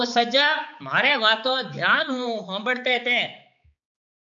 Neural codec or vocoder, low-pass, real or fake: codec, 16 kHz, 4 kbps, X-Codec, HuBERT features, trained on balanced general audio; 7.2 kHz; fake